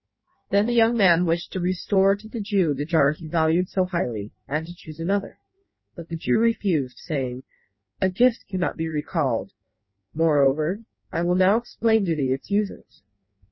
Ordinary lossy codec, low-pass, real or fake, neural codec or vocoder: MP3, 24 kbps; 7.2 kHz; fake; codec, 16 kHz in and 24 kHz out, 1.1 kbps, FireRedTTS-2 codec